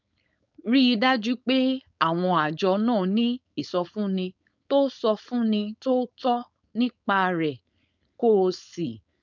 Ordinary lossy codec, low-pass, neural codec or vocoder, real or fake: none; 7.2 kHz; codec, 16 kHz, 4.8 kbps, FACodec; fake